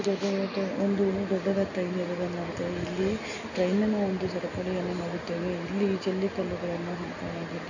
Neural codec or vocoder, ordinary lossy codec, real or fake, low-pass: none; none; real; 7.2 kHz